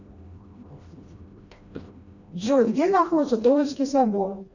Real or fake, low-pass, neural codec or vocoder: fake; 7.2 kHz; codec, 16 kHz, 1 kbps, FreqCodec, smaller model